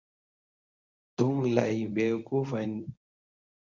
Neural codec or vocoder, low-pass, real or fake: codec, 24 kHz, 0.9 kbps, WavTokenizer, medium speech release version 1; 7.2 kHz; fake